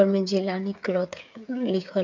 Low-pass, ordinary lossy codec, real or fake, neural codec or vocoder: 7.2 kHz; MP3, 64 kbps; fake; codec, 16 kHz, 8 kbps, FreqCodec, smaller model